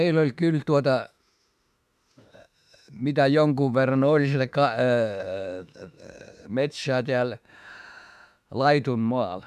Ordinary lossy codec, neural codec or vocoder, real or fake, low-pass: MP3, 96 kbps; autoencoder, 48 kHz, 32 numbers a frame, DAC-VAE, trained on Japanese speech; fake; 14.4 kHz